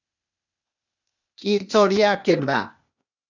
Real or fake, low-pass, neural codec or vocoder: fake; 7.2 kHz; codec, 16 kHz, 0.8 kbps, ZipCodec